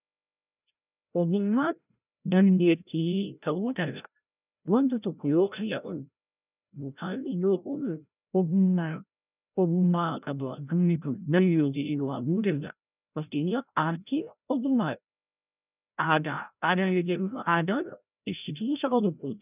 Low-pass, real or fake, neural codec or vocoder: 3.6 kHz; fake; codec, 16 kHz, 0.5 kbps, FreqCodec, larger model